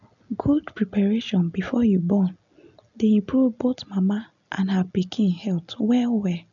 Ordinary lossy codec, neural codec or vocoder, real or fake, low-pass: none; none; real; 7.2 kHz